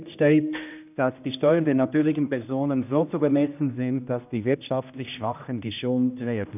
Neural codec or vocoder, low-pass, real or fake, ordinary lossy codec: codec, 16 kHz, 1 kbps, X-Codec, HuBERT features, trained on general audio; 3.6 kHz; fake; none